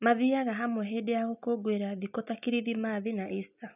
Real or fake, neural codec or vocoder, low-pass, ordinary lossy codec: real; none; 3.6 kHz; none